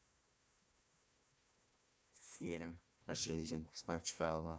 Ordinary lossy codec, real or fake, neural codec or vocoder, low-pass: none; fake; codec, 16 kHz, 1 kbps, FunCodec, trained on Chinese and English, 50 frames a second; none